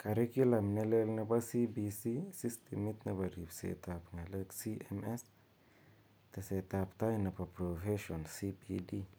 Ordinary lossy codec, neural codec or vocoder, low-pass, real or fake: none; none; none; real